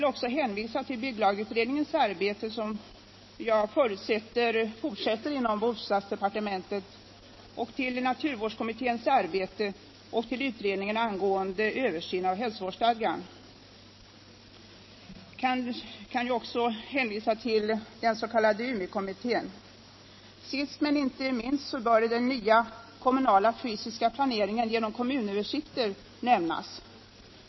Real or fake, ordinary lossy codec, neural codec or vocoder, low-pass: real; MP3, 24 kbps; none; 7.2 kHz